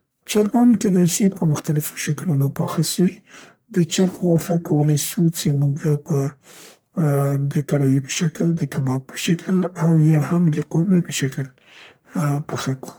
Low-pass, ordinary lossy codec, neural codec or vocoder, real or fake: none; none; codec, 44.1 kHz, 1.7 kbps, Pupu-Codec; fake